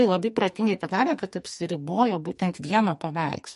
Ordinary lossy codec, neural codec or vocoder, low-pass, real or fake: MP3, 48 kbps; codec, 44.1 kHz, 2.6 kbps, SNAC; 14.4 kHz; fake